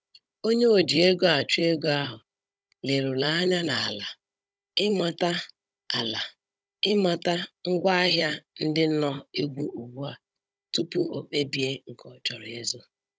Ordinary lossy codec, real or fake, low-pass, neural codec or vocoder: none; fake; none; codec, 16 kHz, 16 kbps, FunCodec, trained on Chinese and English, 50 frames a second